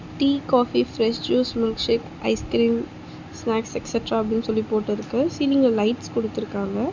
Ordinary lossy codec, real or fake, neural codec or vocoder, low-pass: Opus, 64 kbps; real; none; 7.2 kHz